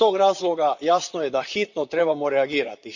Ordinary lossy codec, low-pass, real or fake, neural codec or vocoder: none; 7.2 kHz; fake; vocoder, 44.1 kHz, 128 mel bands, Pupu-Vocoder